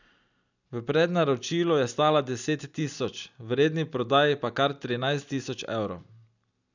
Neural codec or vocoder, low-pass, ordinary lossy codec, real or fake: none; 7.2 kHz; none; real